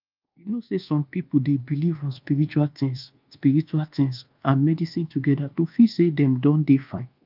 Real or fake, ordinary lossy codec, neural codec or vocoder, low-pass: fake; Opus, 24 kbps; codec, 24 kHz, 1.2 kbps, DualCodec; 5.4 kHz